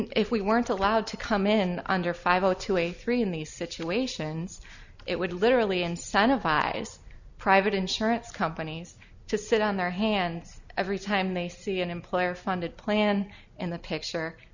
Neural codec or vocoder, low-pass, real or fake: none; 7.2 kHz; real